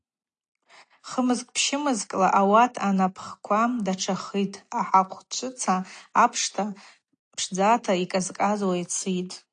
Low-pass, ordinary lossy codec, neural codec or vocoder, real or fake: 9.9 kHz; AAC, 48 kbps; none; real